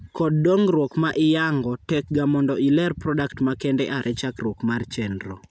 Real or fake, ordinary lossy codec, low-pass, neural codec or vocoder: real; none; none; none